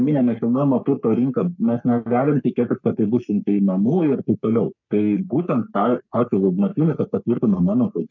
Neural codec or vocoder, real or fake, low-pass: codec, 44.1 kHz, 3.4 kbps, Pupu-Codec; fake; 7.2 kHz